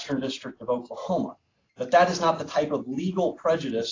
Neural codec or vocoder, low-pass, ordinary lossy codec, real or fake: none; 7.2 kHz; AAC, 32 kbps; real